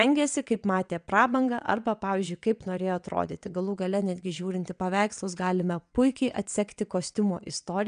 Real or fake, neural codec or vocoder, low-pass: fake; vocoder, 22.05 kHz, 80 mel bands, WaveNeXt; 9.9 kHz